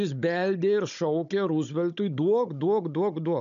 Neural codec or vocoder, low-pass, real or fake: codec, 16 kHz, 16 kbps, FunCodec, trained on Chinese and English, 50 frames a second; 7.2 kHz; fake